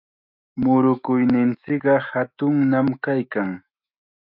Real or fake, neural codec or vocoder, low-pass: fake; autoencoder, 48 kHz, 128 numbers a frame, DAC-VAE, trained on Japanese speech; 5.4 kHz